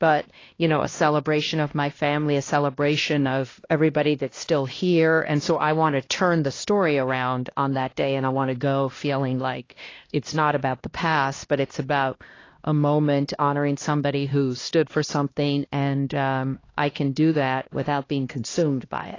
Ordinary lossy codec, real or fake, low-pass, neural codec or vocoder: AAC, 32 kbps; fake; 7.2 kHz; codec, 16 kHz, 1 kbps, X-Codec, HuBERT features, trained on LibriSpeech